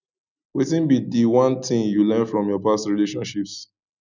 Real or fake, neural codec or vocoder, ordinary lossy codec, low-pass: real; none; none; 7.2 kHz